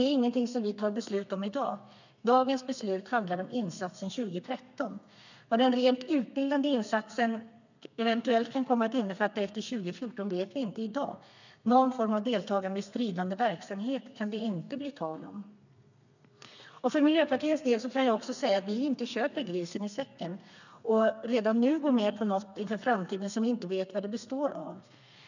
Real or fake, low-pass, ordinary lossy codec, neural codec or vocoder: fake; 7.2 kHz; none; codec, 32 kHz, 1.9 kbps, SNAC